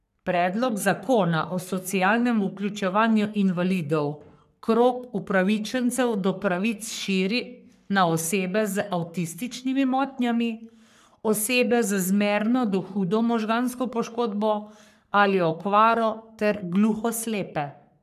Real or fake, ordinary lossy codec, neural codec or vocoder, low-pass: fake; none; codec, 44.1 kHz, 3.4 kbps, Pupu-Codec; 14.4 kHz